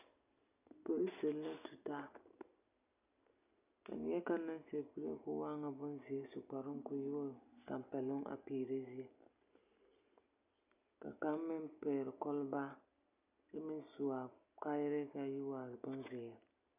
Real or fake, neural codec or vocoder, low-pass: real; none; 3.6 kHz